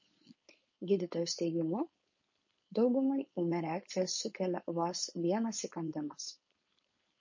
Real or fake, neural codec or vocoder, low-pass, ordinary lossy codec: fake; codec, 16 kHz, 4.8 kbps, FACodec; 7.2 kHz; MP3, 32 kbps